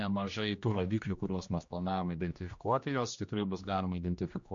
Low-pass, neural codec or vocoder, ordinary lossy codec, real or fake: 7.2 kHz; codec, 16 kHz, 1 kbps, X-Codec, HuBERT features, trained on general audio; MP3, 48 kbps; fake